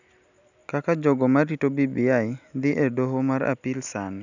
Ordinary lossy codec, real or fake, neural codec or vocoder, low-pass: none; real; none; 7.2 kHz